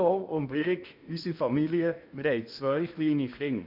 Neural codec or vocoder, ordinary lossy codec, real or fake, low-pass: codec, 16 kHz in and 24 kHz out, 0.8 kbps, FocalCodec, streaming, 65536 codes; none; fake; 5.4 kHz